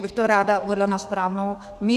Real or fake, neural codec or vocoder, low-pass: fake; codec, 32 kHz, 1.9 kbps, SNAC; 14.4 kHz